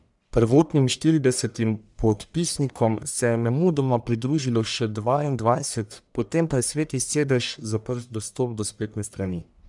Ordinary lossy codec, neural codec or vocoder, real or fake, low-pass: none; codec, 44.1 kHz, 1.7 kbps, Pupu-Codec; fake; 10.8 kHz